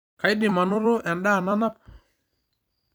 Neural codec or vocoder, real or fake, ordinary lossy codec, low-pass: vocoder, 44.1 kHz, 128 mel bands every 256 samples, BigVGAN v2; fake; none; none